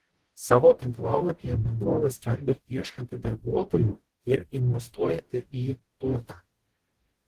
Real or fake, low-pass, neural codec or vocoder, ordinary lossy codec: fake; 14.4 kHz; codec, 44.1 kHz, 0.9 kbps, DAC; Opus, 16 kbps